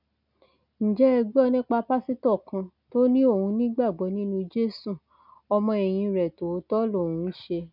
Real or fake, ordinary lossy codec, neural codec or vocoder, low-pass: real; none; none; 5.4 kHz